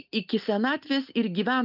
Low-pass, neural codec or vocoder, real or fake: 5.4 kHz; none; real